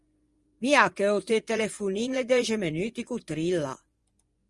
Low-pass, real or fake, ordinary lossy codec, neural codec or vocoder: 10.8 kHz; fake; Opus, 32 kbps; vocoder, 24 kHz, 100 mel bands, Vocos